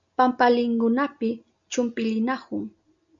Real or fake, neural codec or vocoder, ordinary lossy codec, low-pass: real; none; MP3, 64 kbps; 7.2 kHz